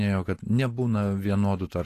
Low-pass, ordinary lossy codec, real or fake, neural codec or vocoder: 14.4 kHz; AAC, 48 kbps; real; none